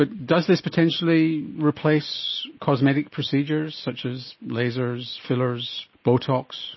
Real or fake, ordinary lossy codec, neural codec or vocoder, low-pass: real; MP3, 24 kbps; none; 7.2 kHz